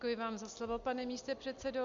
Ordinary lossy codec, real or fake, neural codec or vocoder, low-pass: Opus, 64 kbps; real; none; 7.2 kHz